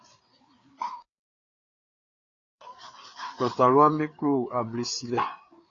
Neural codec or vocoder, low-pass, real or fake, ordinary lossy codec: codec, 16 kHz, 4 kbps, FreqCodec, larger model; 7.2 kHz; fake; AAC, 32 kbps